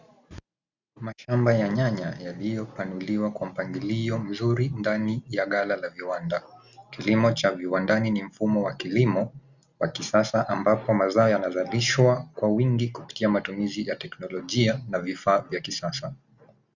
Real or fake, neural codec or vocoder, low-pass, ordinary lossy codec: real; none; 7.2 kHz; Opus, 64 kbps